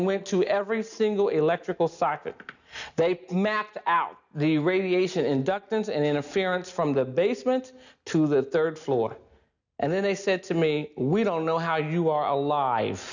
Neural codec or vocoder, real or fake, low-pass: none; real; 7.2 kHz